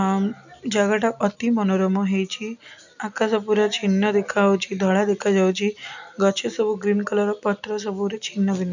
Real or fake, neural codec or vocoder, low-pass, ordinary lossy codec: real; none; 7.2 kHz; none